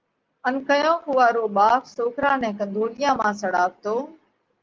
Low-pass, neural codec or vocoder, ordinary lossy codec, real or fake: 7.2 kHz; none; Opus, 32 kbps; real